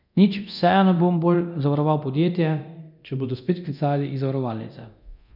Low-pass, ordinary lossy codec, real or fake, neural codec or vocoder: 5.4 kHz; none; fake; codec, 24 kHz, 0.9 kbps, DualCodec